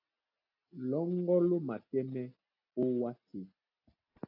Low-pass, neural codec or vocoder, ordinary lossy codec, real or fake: 5.4 kHz; none; AAC, 48 kbps; real